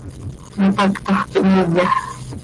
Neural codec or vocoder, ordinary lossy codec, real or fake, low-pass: none; Opus, 24 kbps; real; 10.8 kHz